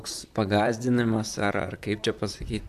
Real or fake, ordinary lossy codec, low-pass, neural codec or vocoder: fake; AAC, 96 kbps; 14.4 kHz; vocoder, 44.1 kHz, 128 mel bands, Pupu-Vocoder